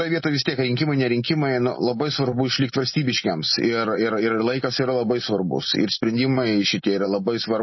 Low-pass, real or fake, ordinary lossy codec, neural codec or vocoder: 7.2 kHz; real; MP3, 24 kbps; none